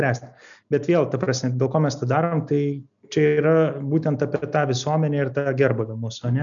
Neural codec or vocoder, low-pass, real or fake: none; 7.2 kHz; real